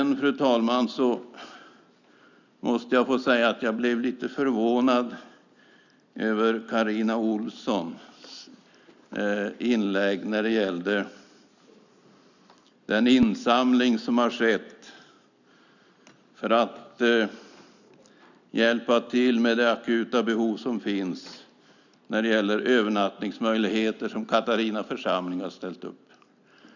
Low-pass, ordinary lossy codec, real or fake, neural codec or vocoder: 7.2 kHz; none; real; none